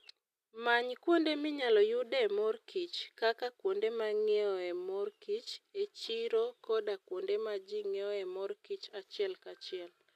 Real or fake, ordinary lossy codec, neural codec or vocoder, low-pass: real; none; none; 14.4 kHz